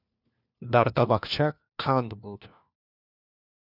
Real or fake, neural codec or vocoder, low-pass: fake; codec, 16 kHz, 1 kbps, FunCodec, trained on LibriTTS, 50 frames a second; 5.4 kHz